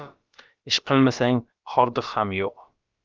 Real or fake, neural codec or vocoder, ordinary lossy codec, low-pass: fake; codec, 16 kHz, about 1 kbps, DyCAST, with the encoder's durations; Opus, 32 kbps; 7.2 kHz